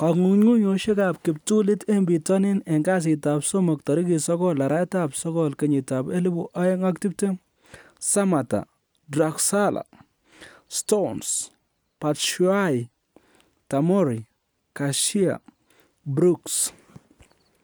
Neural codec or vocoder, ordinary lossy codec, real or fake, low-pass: none; none; real; none